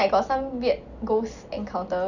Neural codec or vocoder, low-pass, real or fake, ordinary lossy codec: none; 7.2 kHz; real; none